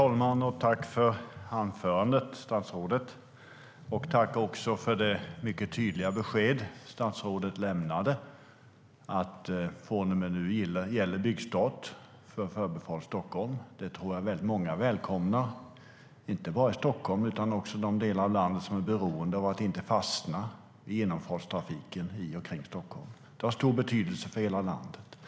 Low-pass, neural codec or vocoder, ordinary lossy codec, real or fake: none; none; none; real